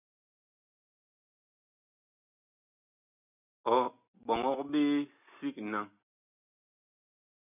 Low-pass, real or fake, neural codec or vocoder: 3.6 kHz; real; none